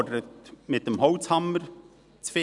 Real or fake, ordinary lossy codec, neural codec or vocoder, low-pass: real; none; none; 10.8 kHz